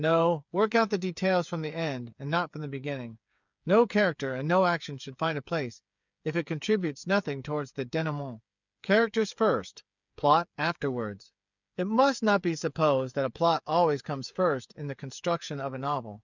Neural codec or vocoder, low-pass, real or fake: codec, 16 kHz, 8 kbps, FreqCodec, smaller model; 7.2 kHz; fake